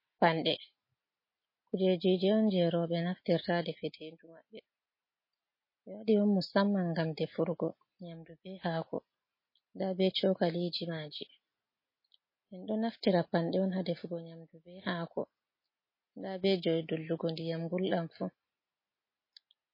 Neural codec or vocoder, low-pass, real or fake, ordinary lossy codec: none; 5.4 kHz; real; MP3, 24 kbps